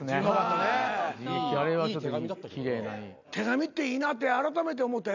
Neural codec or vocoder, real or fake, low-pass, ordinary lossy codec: none; real; 7.2 kHz; none